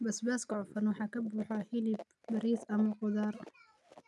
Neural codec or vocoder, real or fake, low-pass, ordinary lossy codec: none; real; none; none